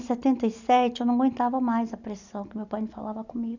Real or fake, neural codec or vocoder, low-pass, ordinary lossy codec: real; none; 7.2 kHz; none